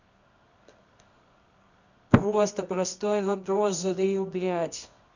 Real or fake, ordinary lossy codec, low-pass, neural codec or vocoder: fake; none; 7.2 kHz; codec, 24 kHz, 0.9 kbps, WavTokenizer, medium music audio release